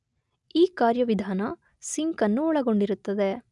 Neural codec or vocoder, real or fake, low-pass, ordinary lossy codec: none; real; 10.8 kHz; none